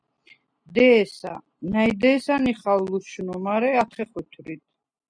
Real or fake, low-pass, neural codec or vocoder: real; 9.9 kHz; none